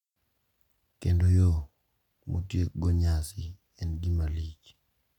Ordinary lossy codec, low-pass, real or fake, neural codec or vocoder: none; 19.8 kHz; real; none